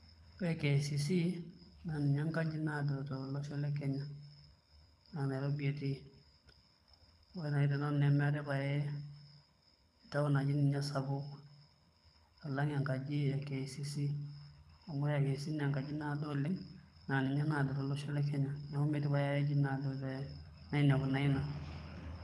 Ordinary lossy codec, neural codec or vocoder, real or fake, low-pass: none; codec, 24 kHz, 6 kbps, HILCodec; fake; none